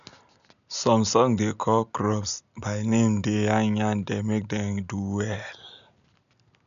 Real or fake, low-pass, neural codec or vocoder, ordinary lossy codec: real; 7.2 kHz; none; none